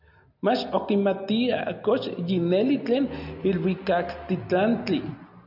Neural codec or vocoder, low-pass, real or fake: none; 5.4 kHz; real